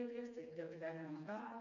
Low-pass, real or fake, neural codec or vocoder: 7.2 kHz; fake; codec, 16 kHz, 2 kbps, FreqCodec, smaller model